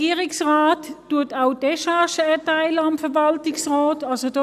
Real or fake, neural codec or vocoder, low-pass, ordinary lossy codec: real; none; 14.4 kHz; none